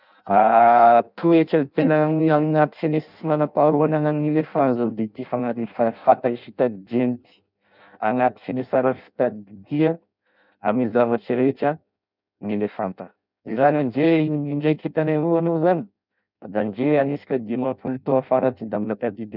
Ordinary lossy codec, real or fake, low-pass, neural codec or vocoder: none; fake; 5.4 kHz; codec, 16 kHz in and 24 kHz out, 0.6 kbps, FireRedTTS-2 codec